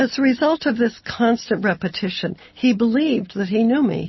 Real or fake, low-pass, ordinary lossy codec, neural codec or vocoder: real; 7.2 kHz; MP3, 24 kbps; none